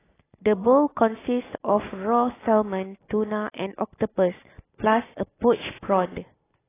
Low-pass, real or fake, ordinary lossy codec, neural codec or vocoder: 3.6 kHz; real; AAC, 16 kbps; none